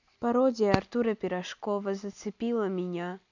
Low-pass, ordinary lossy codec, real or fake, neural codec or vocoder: 7.2 kHz; none; real; none